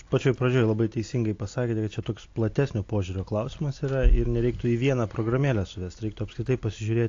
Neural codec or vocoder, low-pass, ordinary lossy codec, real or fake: none; 7.2 kHz; AAC, 48 kbps; real